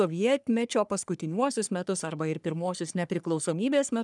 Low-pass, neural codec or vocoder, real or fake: 10.8 kHz; codec, 44.1 kHz, 3.4 kbps, Pupu-Codec; fake